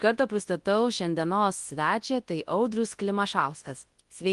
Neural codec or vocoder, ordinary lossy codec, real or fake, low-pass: codec, 24 kHz, 0.9 kbps, WavTokenizer, large speech release; Opus, 24 kbps; fake; 10.8 kHz